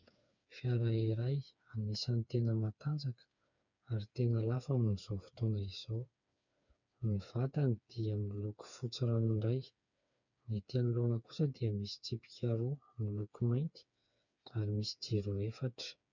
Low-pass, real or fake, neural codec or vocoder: 7.2 kHz; fake; codec, 16 kHz, 4 kbps, FreqCodec, smaller model